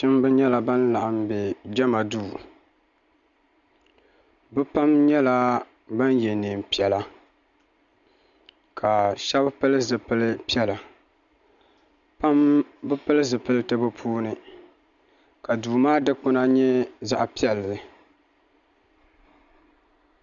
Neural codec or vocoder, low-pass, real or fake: none; 7.2 kHz; real